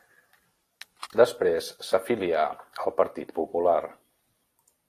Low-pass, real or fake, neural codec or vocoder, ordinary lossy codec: 14.4 kHz; fake; vocoder, 44.1 kHz, 128 mel bands every 256 samples, BigVGAN v2; AAC, 48 kbps